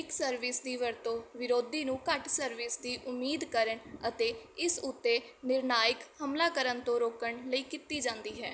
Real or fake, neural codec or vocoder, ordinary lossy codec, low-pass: real; none; none; none